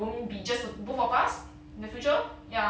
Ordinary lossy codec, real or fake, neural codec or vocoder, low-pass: none; real; none; none